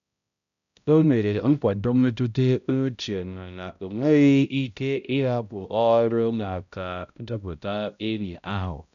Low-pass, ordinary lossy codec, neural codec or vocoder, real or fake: 7.2 kHz; none; codec, 16 kHz, 0.5 kbps, X-Codec, HuBERT features, trained on balanced general audio; fake